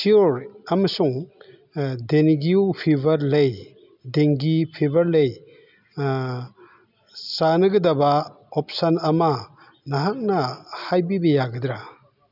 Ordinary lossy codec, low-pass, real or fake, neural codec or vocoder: none; 5.4 kHz; real; none